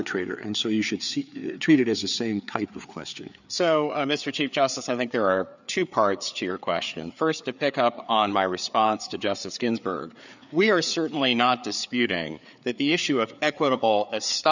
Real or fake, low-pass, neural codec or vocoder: fake; 7.2 kHz; codec, 16 kHz, 4 kbps, FreqCodec, larger model